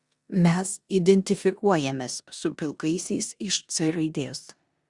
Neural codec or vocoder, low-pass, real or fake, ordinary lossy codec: codec, 16 kHz in and 24 kHz out, 0.9 kbps, LongCat-Audio-Codec, four codebook decoder; 10.8 kHz; fake; Opus, 64 kbps